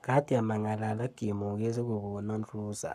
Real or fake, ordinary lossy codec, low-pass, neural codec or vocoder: fake; AAC, 96 kbps; 14.4 kHz; codec, 44.1 kHz, 7.8 kbps, Pupu-Codec